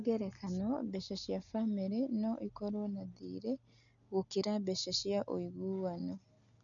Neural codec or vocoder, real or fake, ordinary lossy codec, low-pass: none; real; none; 7.2 kHz